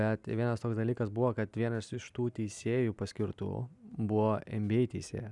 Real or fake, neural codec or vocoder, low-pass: real; none; 10.8 kHz